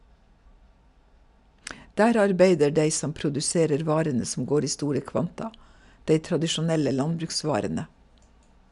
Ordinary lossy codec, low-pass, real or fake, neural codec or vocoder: none; 10.8 kHz; real; none